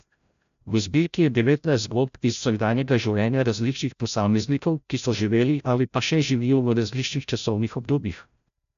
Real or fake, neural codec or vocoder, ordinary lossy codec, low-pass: fake; codec, 16 kHz, 0.5 kbps, FreqCodec, larger model; AAC, 96 kbps; 7.2 kHz